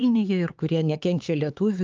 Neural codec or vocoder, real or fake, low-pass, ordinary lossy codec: codec, 16 kHz, 4 kbps, X-Codec, HuBERT features, trained on LibriSpeech; fake; 7.2 kHz; Opus, 24 kbps